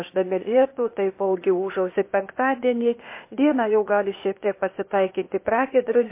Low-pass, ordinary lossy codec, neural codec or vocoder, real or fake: 3.6 kHz; MP3, 24 kbps; codec, 16 kHz, 0.8 kbps, ZipCodec; fake